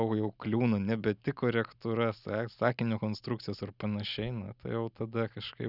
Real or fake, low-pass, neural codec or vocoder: real; 5.4 kHz; none